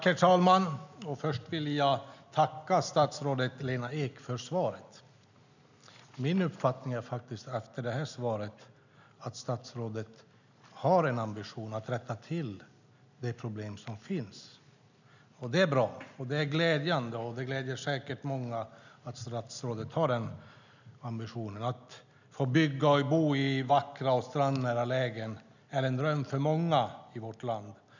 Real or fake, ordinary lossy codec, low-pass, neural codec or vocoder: real; none; 7.2 kHz; none